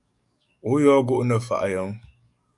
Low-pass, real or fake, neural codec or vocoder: 10.8 kHz; fake; codec, 44.1 kHz, 7.8 kbps, DAC